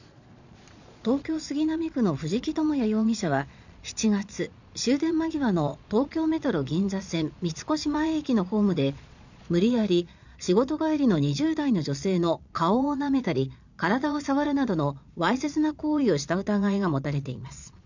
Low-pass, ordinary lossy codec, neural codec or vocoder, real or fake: 7.2 kHz; none; vocoder, 22.05 kHz, 80 mel bands, Vocos; fake